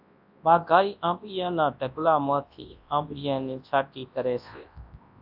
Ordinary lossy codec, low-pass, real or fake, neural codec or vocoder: AAC, 48 kbps; 5.4 kHz; fake; codec, 24 kHz, 0.9 kbps, WavTokenizer, large speech release